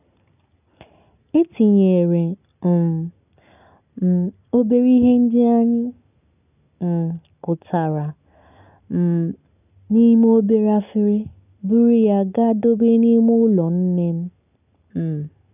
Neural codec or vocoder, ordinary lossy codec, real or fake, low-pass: none; none; real; 3.6 kHz